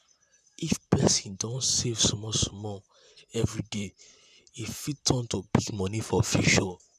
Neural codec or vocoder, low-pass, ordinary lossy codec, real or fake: vocoder, 44.1 kHz, 128 mel bands, Pupu-Vocoder; 14.4 kHz; none; fake